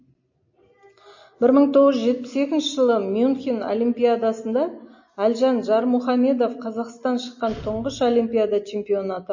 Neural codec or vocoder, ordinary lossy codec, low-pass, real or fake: none; MP3, 32 kbps; 7.2 kHz; real